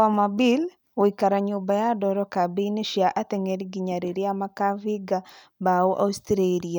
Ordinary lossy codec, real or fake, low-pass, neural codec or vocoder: none; real; none; none